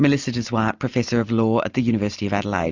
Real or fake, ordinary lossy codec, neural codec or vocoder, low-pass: real; Opus, 64 kbps; none; 7.2 kHz